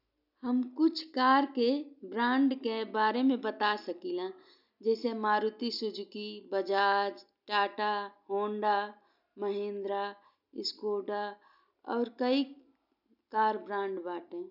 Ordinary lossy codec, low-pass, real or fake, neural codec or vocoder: none; 5.4 kHz; real; none